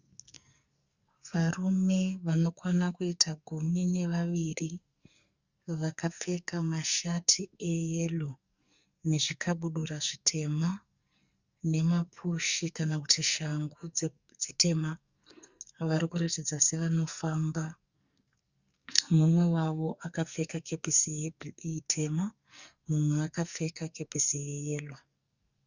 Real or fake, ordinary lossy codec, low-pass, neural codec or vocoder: fake; Opus, 64 kbps; 7.2 kHz; codec, 44.1 kHz, 2.6 kbps, SNAC